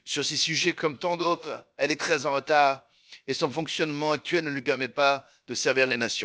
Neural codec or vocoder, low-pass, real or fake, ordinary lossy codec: codec, 16 kHz, about 1 kbps, DyCAST, with the encoder's durations; none; fake; none